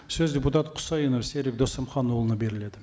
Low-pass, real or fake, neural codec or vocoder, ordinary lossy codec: none; real; none; none